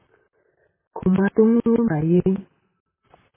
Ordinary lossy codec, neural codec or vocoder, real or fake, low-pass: MP3, 16 kbps; vocoder, 44.1 kHz, 128 mel bands, Pupu-Vocoder; fake; 3.6 kHz